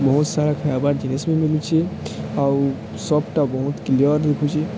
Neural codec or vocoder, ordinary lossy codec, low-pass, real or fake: none; none; none; real